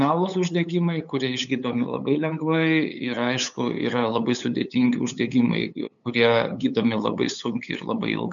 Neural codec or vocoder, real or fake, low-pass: codec, 16 kHz, 8 kbps, FunCodec, trained on LibriTTS, 25 frames a second; fake; 7.2 kHz